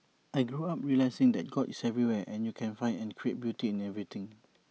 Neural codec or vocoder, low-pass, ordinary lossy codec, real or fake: none; none; none; real